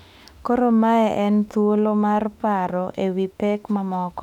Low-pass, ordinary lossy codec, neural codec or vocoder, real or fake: 19.8 kHz; none; autoencoder, 48 kHz, 32 numbers a frame, DAC-VAE, trained on Japanese speech; fake